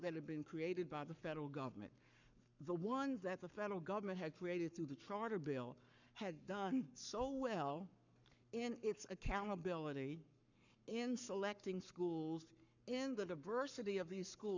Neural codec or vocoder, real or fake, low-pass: codec, 44.1 kHz, 7.8 kbps, Pupu-Codec; fake; 7.2 kHz